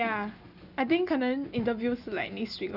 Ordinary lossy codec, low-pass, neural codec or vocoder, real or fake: none; 5.4 kHz; none; real